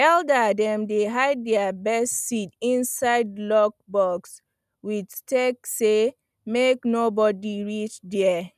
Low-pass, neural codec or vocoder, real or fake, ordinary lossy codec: 14.4 kHz; none; real; none